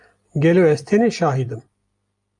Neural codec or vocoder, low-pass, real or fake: none; 10.8 kHz; real